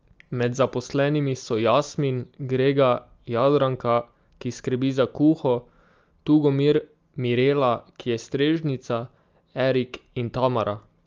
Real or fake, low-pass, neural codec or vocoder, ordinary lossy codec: real; 7.2 kHz; none; Opus, 24 kbps